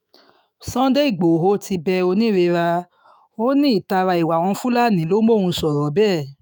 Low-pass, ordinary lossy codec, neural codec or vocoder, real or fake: none; none; autoencoder, 48 kHz, 128 numbers a frame, DAC-VAE, trained on Japanese speech; fake